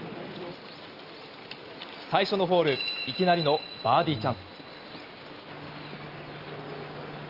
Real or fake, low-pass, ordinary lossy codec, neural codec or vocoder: real; 5.4 kHz; Opus, 32 kbps; none